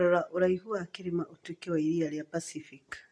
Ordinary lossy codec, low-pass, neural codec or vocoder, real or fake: none; none; none; real